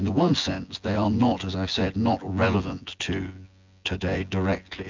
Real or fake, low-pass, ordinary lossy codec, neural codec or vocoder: fake; 7.2 kHz; MP3, 64 kbps; vocoder, 24 kHz, 100 mel bands, Vocos